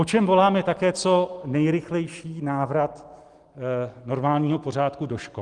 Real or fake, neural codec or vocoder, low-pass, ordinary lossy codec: real; none; 10.8 kHz; Opus, 24 kbps